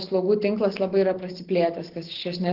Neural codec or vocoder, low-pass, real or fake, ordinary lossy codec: none; 5.4 kHz; real; Opus, 16 kbps